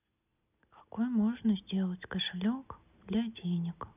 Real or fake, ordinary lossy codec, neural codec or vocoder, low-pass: real; none; none; 3.6 kHz